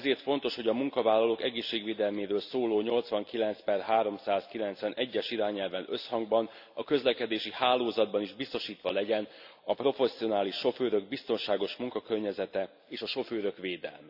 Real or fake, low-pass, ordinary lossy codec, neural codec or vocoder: real; 5.4 kHz; none; none